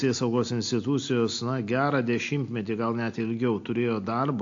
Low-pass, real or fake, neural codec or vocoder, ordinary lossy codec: 7.2 kHz; real; none; AAC, 48 kbps